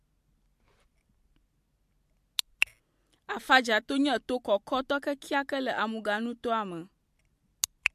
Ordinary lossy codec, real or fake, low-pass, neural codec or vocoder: MP3, 64 kbps; real; 14.4 kHz; none